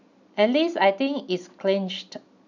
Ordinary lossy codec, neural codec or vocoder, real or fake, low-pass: none; none; real; 7.2 kHz